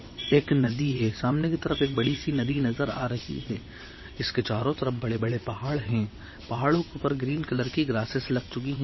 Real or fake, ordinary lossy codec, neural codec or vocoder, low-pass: fake; MP3, 24 kbps; vocoder, 22.05 kHz, 80 mel bands, WaveNeXt; 7.2 kHz